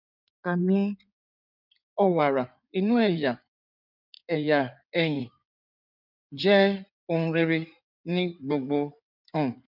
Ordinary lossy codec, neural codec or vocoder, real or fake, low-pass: none; codec, 16 kHz in and 24 kHz out, 2.2 kbps, FireRedTTS-2 codec; fake; 5.4 kHz